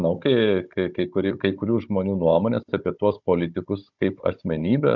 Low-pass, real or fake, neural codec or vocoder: 7.2 kHz; fake; codec, 16 kHz, 16 kbps, FunCodec, trained on Chinese and English, 50 frames a second